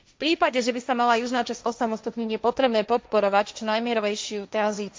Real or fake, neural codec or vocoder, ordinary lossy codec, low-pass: fake; codec, 16 kHz, 1.1 kbps, Voila-Tokenizer; none; none